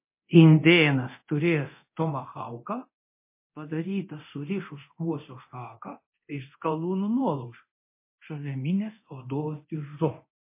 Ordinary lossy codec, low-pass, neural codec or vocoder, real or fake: MP3, 24 kbps; 3.6 kHz; codec, 24 kHz, 0.9 kbps, DualCodec; fake